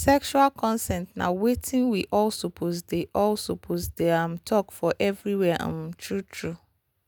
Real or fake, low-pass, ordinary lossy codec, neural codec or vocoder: real; none; none; none